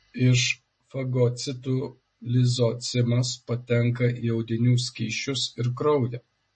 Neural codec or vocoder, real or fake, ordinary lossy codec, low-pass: none; real; MP3, 32 kbps; 10.8 kHz